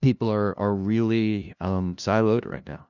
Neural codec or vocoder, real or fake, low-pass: codec, 16 kHz, 0.5 kbps, FunCodec, trained on LibriTTS, 25 frames a second; fake; 7.2 kHz